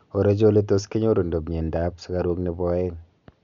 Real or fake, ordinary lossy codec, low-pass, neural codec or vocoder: real; none; 7.2 kHz; none